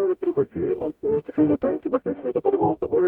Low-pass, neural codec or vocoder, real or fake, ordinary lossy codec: 19.8 kHz; codec, 44.1 kHz, 0.9 kbps, DAC; fake; MP3, 96 kbps